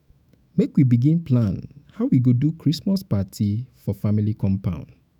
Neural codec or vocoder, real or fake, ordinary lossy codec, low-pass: autoencoder, 48 kHz, 128 numbers a frame, DAC-VAE, trained on Japanese speech; fake; none; 19.8 kHz